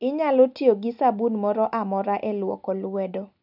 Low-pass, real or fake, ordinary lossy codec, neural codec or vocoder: 5.4 kHz; real; none; none